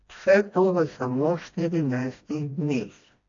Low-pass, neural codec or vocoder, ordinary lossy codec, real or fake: 7.2 kHz; codec, 16 kHz, 1 kbps, FreqCodec, smaller model; AAC, 48 kbps; fake